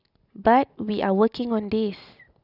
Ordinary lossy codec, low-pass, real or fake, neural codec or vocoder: none; 5.4 kHz; real; none